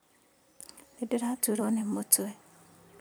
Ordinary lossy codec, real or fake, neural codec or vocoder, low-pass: none; fake; vocoder, 44.1 kHz, 128 mel bands every 256 samples, BigVGAN v2; none